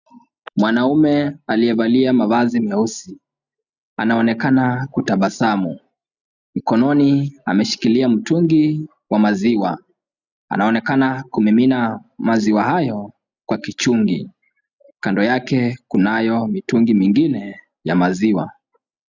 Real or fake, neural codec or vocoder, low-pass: real; none; 7.2 kHz